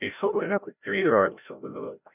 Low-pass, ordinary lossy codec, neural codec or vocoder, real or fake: 3.6 kHz; none; codec, 16 kHz, 0.5 kbps, FreqCodec, larger model; fake